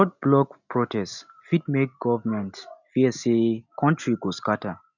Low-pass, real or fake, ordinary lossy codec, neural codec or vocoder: 7.2 kHz; real; none; none